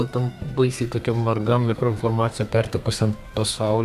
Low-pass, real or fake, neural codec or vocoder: 14.4 kHz; fake; codec, 32 kHz, 1.9 kbps, SNAC